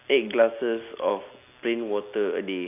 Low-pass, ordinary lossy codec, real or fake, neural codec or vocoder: 3.6 kHz; none; real; none